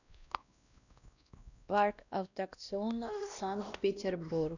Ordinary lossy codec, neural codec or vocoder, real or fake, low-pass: none; codec, 16 kHz, 1 kbps, X-Codec, WavLM features, trained on Multilingual LibriSpeech; fake; 7.2 kHz